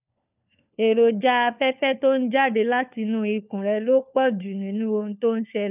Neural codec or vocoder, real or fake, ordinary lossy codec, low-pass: codec, 16 kHz, 4 kbps, FunCodec, trained on LibriTTS, 50 frames a second; fake; none; 3.6 kHz